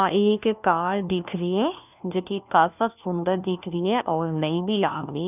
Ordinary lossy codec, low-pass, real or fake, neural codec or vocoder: none; 3.6 kHz; fake; codec, 16 kHz, 1 kbps, FunCodec, trained on LibriTTS, 50 frames a second